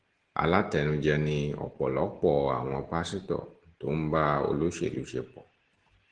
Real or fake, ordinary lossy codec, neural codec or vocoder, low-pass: real; Opus, 16 kbps; none; 9.9 kHz